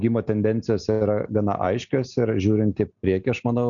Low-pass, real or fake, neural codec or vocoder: 7.2 kHz; real; none